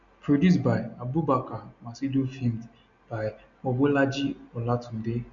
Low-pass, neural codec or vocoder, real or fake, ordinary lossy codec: 7.2 kHz; none; real; none